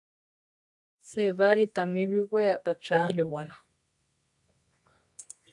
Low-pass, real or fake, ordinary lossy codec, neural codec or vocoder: 10.8 kHz; fake; AAC, 64 kbps; codec, 24 kHz, 0.9 kbps, WavTokenizer, medium music audio release